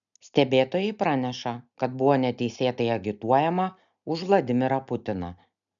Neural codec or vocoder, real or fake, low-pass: none; real; 7.2 kHz